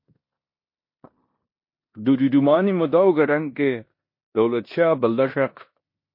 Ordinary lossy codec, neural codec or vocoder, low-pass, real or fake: MP3, 32 kbps; codec, 16 kHz in and 24 kHz out, 0.9 kbps, LongCat-Audio-Codec, four codebook decoder; 5.4 kHz; fake